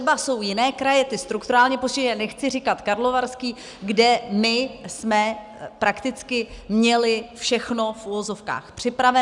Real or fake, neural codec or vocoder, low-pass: real; none; 10.8 kHz